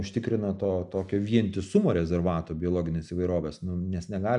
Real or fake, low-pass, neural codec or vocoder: real; 10.8 kHz; none